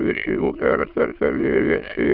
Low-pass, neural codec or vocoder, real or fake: 5.4 kHz; autoencoder, 22.05 kHz, a latent of 192 numbers a frame, VITS, trained on many speakers; fake